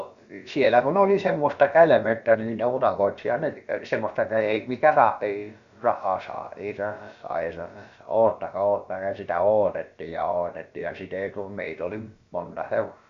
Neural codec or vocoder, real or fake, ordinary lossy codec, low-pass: codec, 16 kHz, about 1 kbps, DyCAST, with the encoder's durations; fake; Opus, 64 kbps; 7.2 kHz